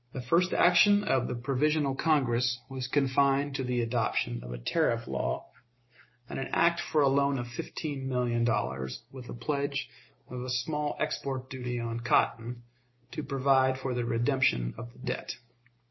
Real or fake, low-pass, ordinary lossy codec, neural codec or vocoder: real; 7.2 kHz; MP3, 24 kbps; none